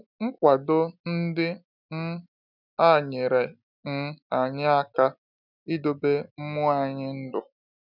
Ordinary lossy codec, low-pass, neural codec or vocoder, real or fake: none; 5.4 kHz; none; real